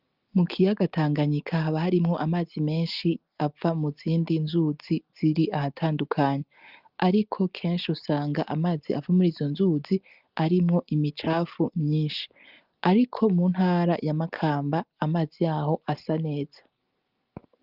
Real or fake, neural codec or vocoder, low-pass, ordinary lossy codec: real; none; 5.4 kHz; Opus, 24 kbps